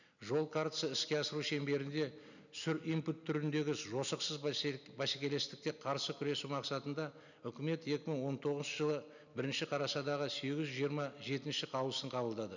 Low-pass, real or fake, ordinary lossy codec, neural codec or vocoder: 7.2 kHz; real; none; none